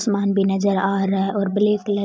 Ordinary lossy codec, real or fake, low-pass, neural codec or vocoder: none; real; none; none